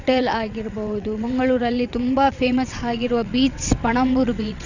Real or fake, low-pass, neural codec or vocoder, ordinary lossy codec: fake; 7.2 kHz; vocoder, 22.05 kHz, 80 mel bands, WaveNeXt; none